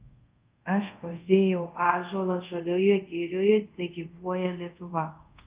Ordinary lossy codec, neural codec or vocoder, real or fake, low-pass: Opus, 24 kbps; codec, 24 kHz, 0.5 kbps, DualCodec; fake; 3.6 kHz